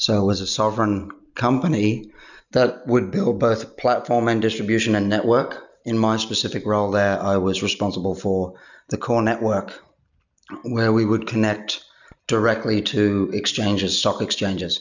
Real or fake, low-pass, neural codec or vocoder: real; 7.2 kHz; none